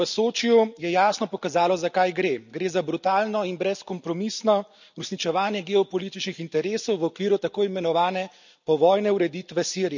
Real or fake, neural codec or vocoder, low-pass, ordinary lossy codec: real; none; 7.2 kHz; none